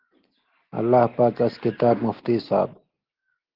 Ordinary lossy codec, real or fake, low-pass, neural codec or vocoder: Opus, 16 kbps; real; 5.4 kHz; none